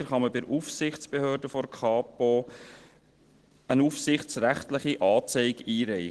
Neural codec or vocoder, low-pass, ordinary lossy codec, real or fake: none; 10.8 kHz; Opus, 16 kbps; real